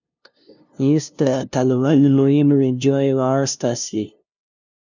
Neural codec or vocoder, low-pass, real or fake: codec, 16 kHz, 0.5 kbps, FunCodec, trained on LibriTTS, 25 frames a second; 7.2 kHz; fake